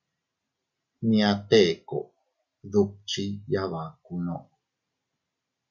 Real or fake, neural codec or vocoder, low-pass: real; none; 7.2 kHz